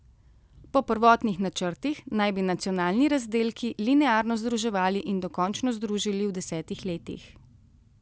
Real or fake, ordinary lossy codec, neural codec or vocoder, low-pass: real; none; none; none